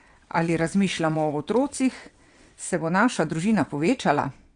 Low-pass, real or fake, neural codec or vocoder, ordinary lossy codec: 9.9 kHz; fake; vocoder, 22.05 kHz, 80 mel bands, WaveNeXt; Opus, 64 kbps